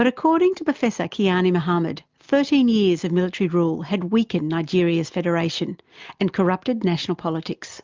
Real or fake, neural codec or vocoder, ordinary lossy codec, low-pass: real; none; Opus, 24 kbps; 7.2 kHz